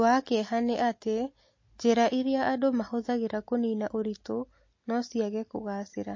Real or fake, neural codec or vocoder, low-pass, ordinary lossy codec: real; none; 7.2 kHz; MP3, 32 kbps